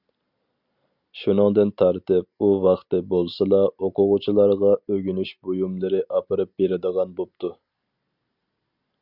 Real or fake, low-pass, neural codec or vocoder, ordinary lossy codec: real; 5.4 kHz; none; Opus, 64 kbps